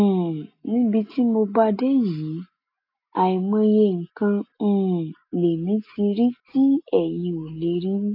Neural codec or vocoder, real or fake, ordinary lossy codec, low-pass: none; real; AAC, 32 kbps; 5.4 kHz